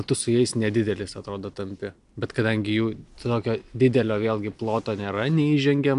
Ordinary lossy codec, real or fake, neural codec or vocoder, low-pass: AAC, 96 kbps; real; none; 10.8 kHz